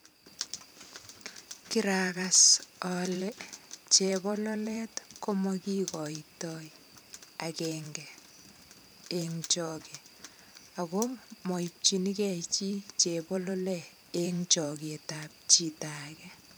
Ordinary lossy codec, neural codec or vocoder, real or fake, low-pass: none; vocoder, 44.1 kHz, 128 mel bands every 512 samples, BigVGAN v2; fake; none